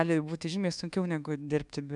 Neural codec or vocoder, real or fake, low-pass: codec, 24 kHz, 1.2 kbps, DualCodec; fake; 10.8 kHz